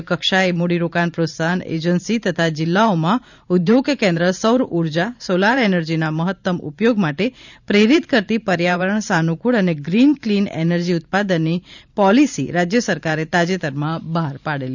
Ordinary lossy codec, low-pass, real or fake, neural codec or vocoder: none; 7.2 kHz; fake; vocoder, 44.1 kHz, 128 mel bands every 512 samples, BigVGAN v2